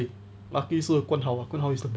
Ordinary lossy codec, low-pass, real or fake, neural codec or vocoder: none; none; real; none